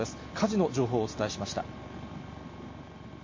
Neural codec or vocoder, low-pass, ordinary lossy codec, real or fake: none; 7.2 kHz; MP3, 32 kbps; real